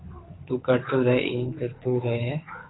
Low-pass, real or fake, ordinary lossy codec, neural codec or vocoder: 7.2 kHz; fake; AAC, 16 kbps; codec, 24 kHz, 6 kbps, HILCodec